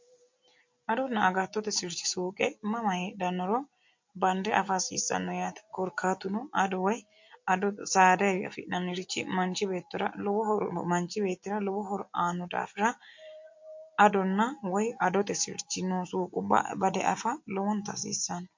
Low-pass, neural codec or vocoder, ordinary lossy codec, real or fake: 7.2 kHz; none; MP3, 48 kbps; real